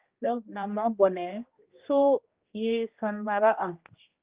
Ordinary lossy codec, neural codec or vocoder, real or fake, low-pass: Opus, 32 kbps; codec, 16 kHz, 1 kbps, X-Codec, HuBERT features, trained on general audio; fake; 3.6 kHz